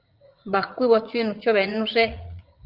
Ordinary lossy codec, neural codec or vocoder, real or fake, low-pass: Opus, 24 kbps; vocoder, 44.1 kHz, 80 mel bands, Vocos; fake; 5.4 kHz